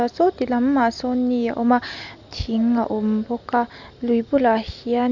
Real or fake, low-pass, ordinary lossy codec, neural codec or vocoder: real; 7.2 kHz; none; none